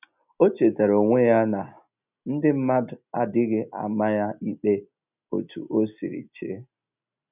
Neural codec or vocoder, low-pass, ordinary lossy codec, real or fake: none; 3.6 kHz; none; real